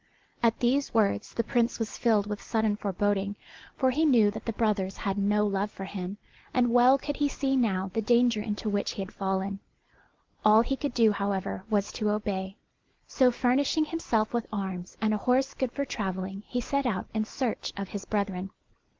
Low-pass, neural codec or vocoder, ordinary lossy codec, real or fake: 7.2 kHz; none; Opus, 16 kbps; real